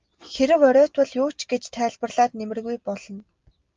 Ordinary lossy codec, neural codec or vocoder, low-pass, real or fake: Opus, 32 kbps; none; 7.2 kHz; real